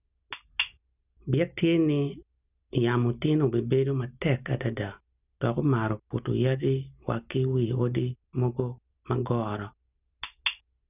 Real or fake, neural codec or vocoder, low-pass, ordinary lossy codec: real; none; 3.6 kHz; none